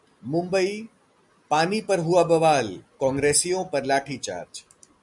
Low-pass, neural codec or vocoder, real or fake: 10.8 kHz; none; real